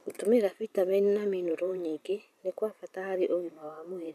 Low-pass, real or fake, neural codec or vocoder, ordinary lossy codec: 14.4 kHz; fake; vocoder, 44.1 kHz, 128 mel bands every 512 samples, BigVGAN v2; none